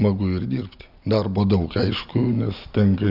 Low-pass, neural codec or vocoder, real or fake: 5.4 kHz; none; real